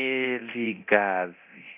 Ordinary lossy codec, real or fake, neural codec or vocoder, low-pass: none; fake; codec, 24 kHz, 0.9 kbps, DualCodec; 3.6 kHz